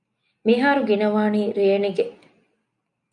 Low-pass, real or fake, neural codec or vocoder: 10.8 kHz; real; none